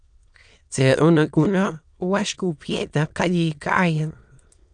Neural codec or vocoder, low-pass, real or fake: autoencoder, 22.05 kHz, a latent of 192 numbers a frame, VITS, trained on many speakers; 9.9 kHz; fake